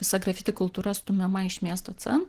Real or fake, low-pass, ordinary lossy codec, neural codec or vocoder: real; 14.4 kHz; Opus, 16 kbps; none